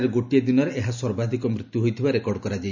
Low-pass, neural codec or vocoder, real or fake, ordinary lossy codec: 7.2 kHz; none; real; none